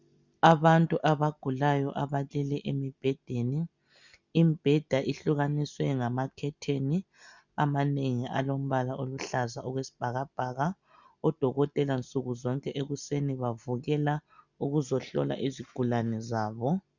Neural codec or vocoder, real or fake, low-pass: none; real; 7.2 kHz